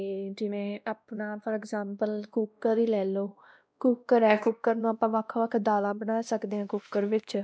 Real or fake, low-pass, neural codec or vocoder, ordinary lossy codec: fake; none; codec, 16 kHz, 1 kbps, X-Codec, WavLM features, trained on Multilingual LibriSpeech; none